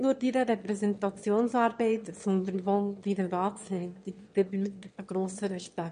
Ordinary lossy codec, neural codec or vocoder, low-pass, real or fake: MP3, 48 kbps; autoencoder, 22.05 kHz, a latent of 192 numbers a frame, VITS, trained on one speaker; 9.9 kHz; fake